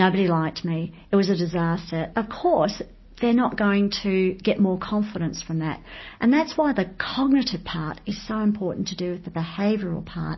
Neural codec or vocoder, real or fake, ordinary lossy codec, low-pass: none; real; MP3, 24 kbps; 7.2 kHz